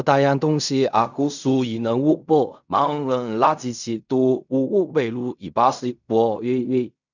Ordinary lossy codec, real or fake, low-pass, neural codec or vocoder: none; fake; 7.2 kHz; codec, 16 kHz in and 24 kHz out, 0.4 kbps, LongCat-Audio-Codec, fine tuned four codebook decoder